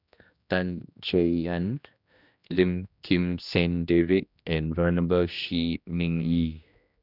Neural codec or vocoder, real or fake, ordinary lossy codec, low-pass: codec, 16 kHz, 1 kbps, X-Codec, HuBERT features, trained on general audio; fake; none; 5.4 kHz